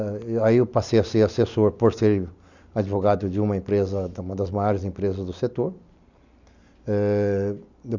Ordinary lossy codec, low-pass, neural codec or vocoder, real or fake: none; 7.2 kHz; none; real